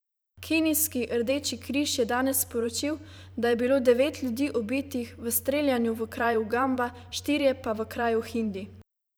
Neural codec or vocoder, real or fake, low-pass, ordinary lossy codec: vocoder, 44.1 kHz, 128 mel bands every 512 samples, BigVGAN v2; fake; none; none